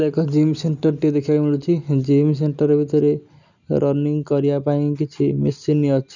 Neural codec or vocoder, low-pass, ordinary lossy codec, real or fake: none; 7.2 kHz; none; real